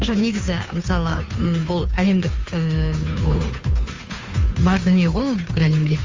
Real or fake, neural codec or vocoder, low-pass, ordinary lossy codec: fake; codec, 16 kHz, 2 kbps, FunCodec, trained on Chinese and English, 25 frames a second; 7.2 kHz; Opus, 32 kbps